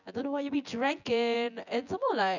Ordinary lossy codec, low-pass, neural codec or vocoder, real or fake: none; 7.2 kHz; vocoder, 24 kHz, 100 mel bands, Vocos; fake